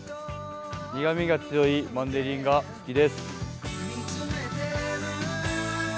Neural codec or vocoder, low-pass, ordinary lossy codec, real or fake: none; none; none; real